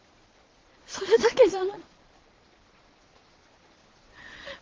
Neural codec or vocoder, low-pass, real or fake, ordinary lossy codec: vocoder, 22.05 kHz, 80 mel bands, Vocos; 7.2 kHz; fake; Opus, 16 kbps